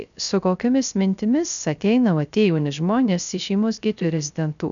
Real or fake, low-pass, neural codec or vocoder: fake; 7.2 kHz; codec, 16 kHz, 0.3 kbps, FocalCodec